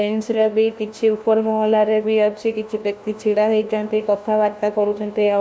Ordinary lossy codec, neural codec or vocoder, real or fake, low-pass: none; codec, 16 kHz, 1 kbps, FunCodec, trained on LibriTTS, 50 frames a second; fake; none